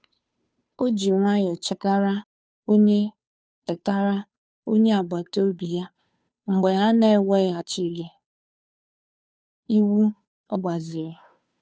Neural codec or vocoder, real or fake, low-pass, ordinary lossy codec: codec, 16 kHz, 2 kbps, FunCodec, trained on Chinese and English, 25 frames a second; fake; none; none